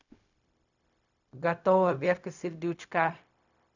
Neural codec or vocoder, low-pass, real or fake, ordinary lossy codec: codec, 16 kHz, 0.4 kbps, LongCat-Audio-Codec; 7.2 kHz; fake; none